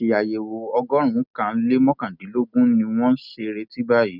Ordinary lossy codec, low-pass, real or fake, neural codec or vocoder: none; 5.4 kHz; real; none